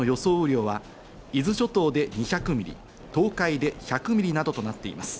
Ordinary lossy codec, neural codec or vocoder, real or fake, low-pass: none; none; real; none